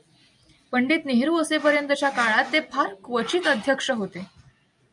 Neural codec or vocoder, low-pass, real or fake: none; 10.8 kHz; real